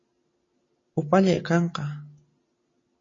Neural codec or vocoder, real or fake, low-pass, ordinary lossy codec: none; real; 7.2 kHz; MP3, 32 kbps